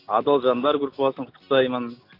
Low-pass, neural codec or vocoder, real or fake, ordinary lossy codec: 5.4 kHz; none; real; AAC, 32 kbps